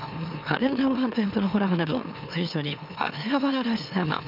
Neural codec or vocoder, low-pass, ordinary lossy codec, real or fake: autoencoder, 44.1 kHz, a latent of 192 numbers a frame, MeloTTS; 5.4 kHz; none; fake